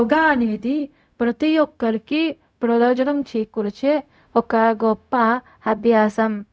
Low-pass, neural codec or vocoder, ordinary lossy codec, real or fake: none; codec, 16 kHz, 0.4 kbps, LongCat-Audio-Codec; none; fake